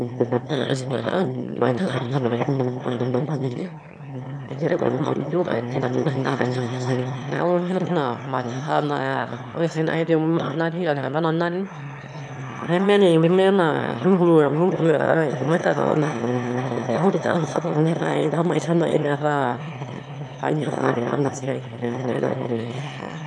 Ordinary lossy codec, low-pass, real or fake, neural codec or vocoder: none; 9.9 kHz; fake; autoencoder, 22.05 kHz, a latent of 192 numbers a frame, VITS, trained on one speaker